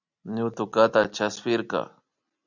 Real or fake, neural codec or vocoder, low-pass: real; none; 7.2 kHz